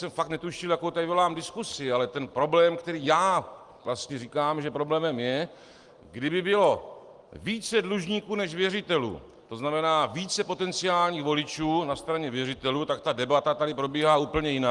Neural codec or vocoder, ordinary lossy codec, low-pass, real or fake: none; Opus, 24 kbps; 10.8 kHz; real